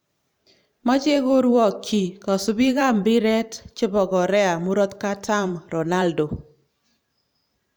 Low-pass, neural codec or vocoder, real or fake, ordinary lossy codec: none; vocoder, 44.1 kHz, 128 mel bands every 512 samples, BigVGAN v2; fake; none